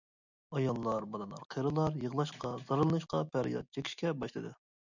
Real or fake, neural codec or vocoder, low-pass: real; none; 7.2 kHz